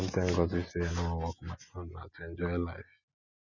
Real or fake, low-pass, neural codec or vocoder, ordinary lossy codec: real; 7.2 kHz; none; none